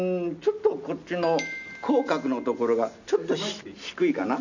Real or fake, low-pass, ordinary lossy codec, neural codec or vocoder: real; 7.2 kHz; none; none